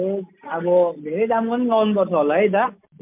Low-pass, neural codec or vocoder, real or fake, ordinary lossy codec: 3.6 kHz; none; real; MP3, 32 kbps